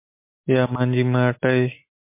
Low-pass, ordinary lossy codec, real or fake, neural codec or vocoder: 3.6 kHz; MP3, 24 kbps; real; none